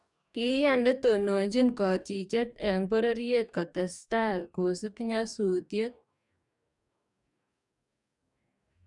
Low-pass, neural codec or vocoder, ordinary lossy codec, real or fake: 10.8 kHz; codec, 44.1 kHz, 2.6 kbps, DAC; none; fake